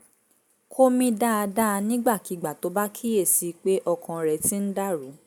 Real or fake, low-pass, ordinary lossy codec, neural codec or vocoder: real; none; none; none